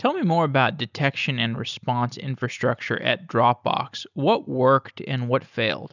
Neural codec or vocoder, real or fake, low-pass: none; real; 7.2 kHz